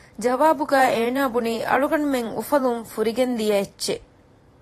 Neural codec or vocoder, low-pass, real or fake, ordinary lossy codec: vocoder, 44.1 kHz, 128 mel bands every 512 samples, BigVGAN v2; 14.4 kHz; fake; AAC, 48 kbps